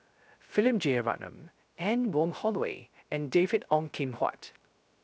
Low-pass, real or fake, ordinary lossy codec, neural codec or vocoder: none; fake; none; codec, 16 kHz, 0.3 kbps, FocalCodec